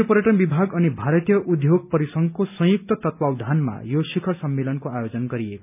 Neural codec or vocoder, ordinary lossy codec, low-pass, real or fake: none; none; 3.6 kHz; real